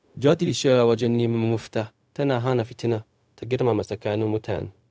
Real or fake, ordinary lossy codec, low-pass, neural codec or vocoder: fake; none; none; codec, 16 kHz, 0.4 kbps, LongCat-Audio-Codec